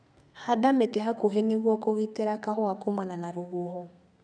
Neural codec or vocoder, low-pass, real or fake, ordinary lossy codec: codec, 32 kHz, 1.9 kbps, SNAC; 9.9 kHz; fake; none